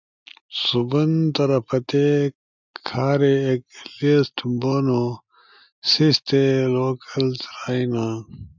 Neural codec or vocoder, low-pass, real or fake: none; 7.2 kHz; real